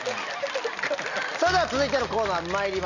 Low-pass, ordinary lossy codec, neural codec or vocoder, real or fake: 7.2 kHz; none; none; real